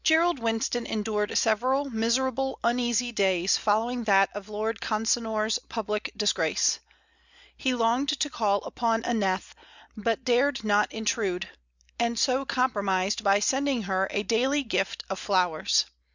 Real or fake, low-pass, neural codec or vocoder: real; 7.2 kHz; none